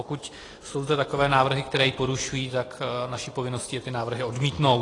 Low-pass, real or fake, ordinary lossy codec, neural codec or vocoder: 10.8 kHz; real; AAC, 32 kbps; none